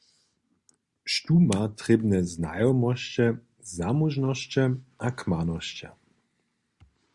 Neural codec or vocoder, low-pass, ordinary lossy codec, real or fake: none; 10.8 kHz; Opus, 64 kbps; real